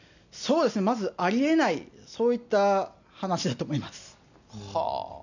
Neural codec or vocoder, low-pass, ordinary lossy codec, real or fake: none; 7.2 kHz; none; real